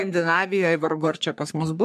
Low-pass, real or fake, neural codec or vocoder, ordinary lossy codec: 14.4 kHz; fake; codec, 44.1 kHz, 3.4 kbps, Pupu-Codec; MP3, 96 kbps